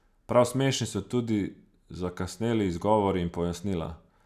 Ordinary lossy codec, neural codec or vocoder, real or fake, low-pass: none; none; real; 14.4 kHz